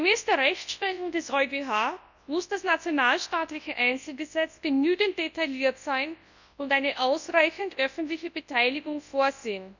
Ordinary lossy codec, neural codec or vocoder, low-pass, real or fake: none; codec, 24 kHz, 0.9 kbps, WavTokenizer, large speech release; 7.2 kHz; fake